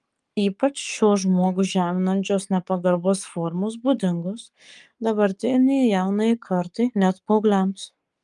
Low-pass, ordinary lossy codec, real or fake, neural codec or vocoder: 10.8 kHz; Opus, 32 kbps; fake; codec, 44.1 kHz, 7.8 kbps, Pupu-Codec